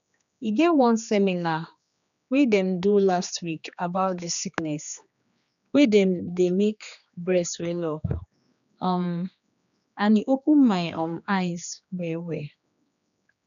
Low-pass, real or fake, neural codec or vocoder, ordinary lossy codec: 7.2 kHz; fake; codec, 16 kHz, 2 kbps, X-Codec, HuBERT features, trained on general audio; none